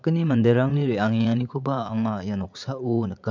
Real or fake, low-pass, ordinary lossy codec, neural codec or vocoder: fake; 7.2 kHz; MP3, 64 kbps; vocoder, 44.1 kHz, 80 mel bands, Vocos